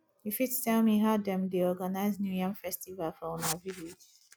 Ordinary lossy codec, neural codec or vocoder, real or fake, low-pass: none; none; real; none